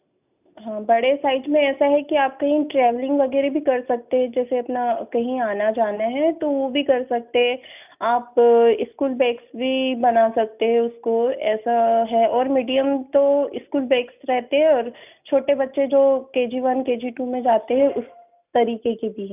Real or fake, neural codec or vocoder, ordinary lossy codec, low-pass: real; none; none; 3.6 kHz